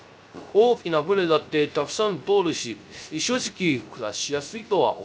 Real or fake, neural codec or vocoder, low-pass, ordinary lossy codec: fake; codec, 16 kHz, 0.3 kbps, FocalCodec; none; none